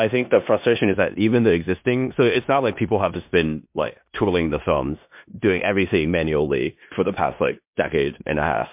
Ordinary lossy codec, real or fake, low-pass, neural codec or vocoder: MP3, 32 kbps; fake; 3.6 kHz; codec, 16 kHz in and 24 kHz out, 0.9 kbps, LongCat-Audio-Codec, four codebook decoder